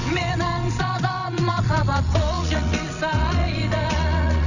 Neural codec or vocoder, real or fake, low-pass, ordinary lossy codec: vocoder, 22.05 kHz, 80 mel bands, WaveNeXt; fake; 7.2 kHz; none